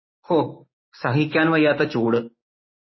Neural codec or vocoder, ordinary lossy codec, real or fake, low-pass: codec, 16 kHz, 6 kbps, DAC; MP3, 24 kbps; fake; 7.2 kHz